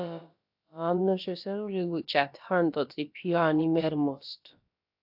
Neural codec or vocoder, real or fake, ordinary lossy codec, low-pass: codec, 16 kHz, about 1 kbps, DyCAST, with the encoder's durations; fake; AAC, 48 kbps; 5.4 kHz